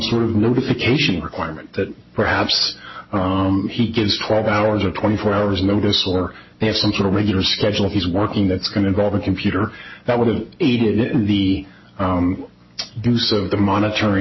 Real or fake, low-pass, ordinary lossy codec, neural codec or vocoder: real; 7.2 kHz; MP3, 24 kbps; none